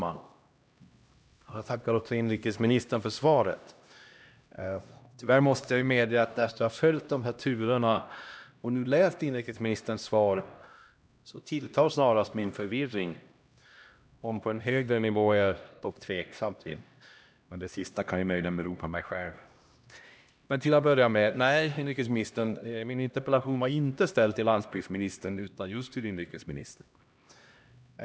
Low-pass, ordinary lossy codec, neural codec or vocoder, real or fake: none; none; codec, 16 kHz, 1 kbps, X-Codec, HuBERT features, trained on LibriSpeech; fake